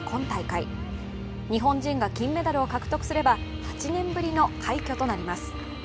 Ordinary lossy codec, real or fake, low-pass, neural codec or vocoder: none; real; none; none